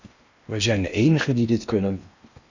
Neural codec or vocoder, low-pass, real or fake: codec, 16 kHz in and 24 kHz out, 0.8 kbps, FocalCodec, streaming, 65536 codes; 7.2 kHz; fake